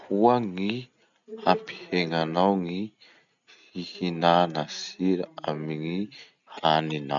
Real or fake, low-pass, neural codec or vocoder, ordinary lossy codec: real; 7.2 kHz; none; none